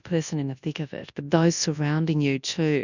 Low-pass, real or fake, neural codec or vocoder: 7.2 kHz; fake; codec, 24 kHz, 0.9 kbps, WavTokenizer, large speech release